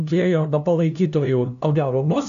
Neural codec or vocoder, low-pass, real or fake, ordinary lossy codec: codec, 16 kHz, 0.5 kbps, FunCodec, trained on LibriTTS, 25 frames a second; 7.2 kHz; fake; MP3, 96 kbps